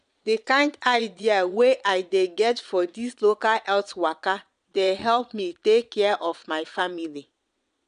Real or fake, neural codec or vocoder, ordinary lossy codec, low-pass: fake; vocoder, 22.05 kHz, 80 mel bands, Vocos; none; 9.9 kHz